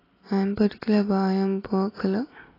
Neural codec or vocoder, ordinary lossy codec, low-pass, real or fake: none; AAC, 24 kbps; 5.4 kHz; real